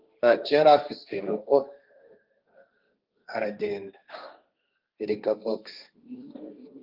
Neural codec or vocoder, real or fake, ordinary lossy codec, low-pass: codec, 16 kHz, 1.1 kbps, Voila-Tokenizer; fake; Opus, 24 kbps; 5.4 kHz